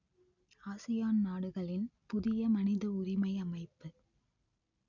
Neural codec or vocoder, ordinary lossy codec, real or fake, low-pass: none; none; real; 7.2 kHz